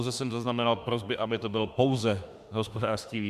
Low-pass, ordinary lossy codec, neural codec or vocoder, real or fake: 14.4 kHz; Opus, 64 kbps; autoencoder, 48 kHz, 32 numbers a frame, DAC-VAE, trained on Japanese speech; fake